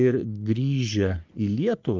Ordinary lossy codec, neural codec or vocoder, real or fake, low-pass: Opus, 24 kbps; codec, 44.1 kHz, 3.4 kbps, Pupu-Codec; fake; 7.2 kHz